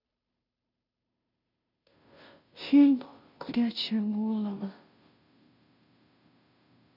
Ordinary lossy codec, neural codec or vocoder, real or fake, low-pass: none; codec, 16 kHz, 0.5 kbps, FunCodec, trained on Chinese and English, 25 frames a second; fake; 5.4 kHz